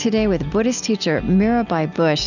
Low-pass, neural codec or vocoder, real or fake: 7.2 kHz; none; real